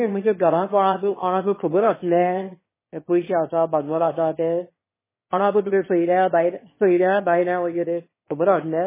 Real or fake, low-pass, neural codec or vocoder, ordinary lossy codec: fake; 3.6 kHz; autoencoder, 22.05 kHz, a latent of 192 numbers a frame, VITS, trained on one speaker; MP3, 16 kbps